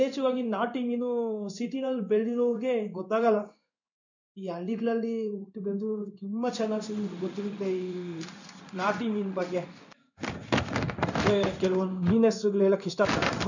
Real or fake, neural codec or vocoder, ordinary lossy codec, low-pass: fake; codec, 16 kHz in and 24 kHz out, 1 kbps, XY-Tokenizer; none; 7.2 kHz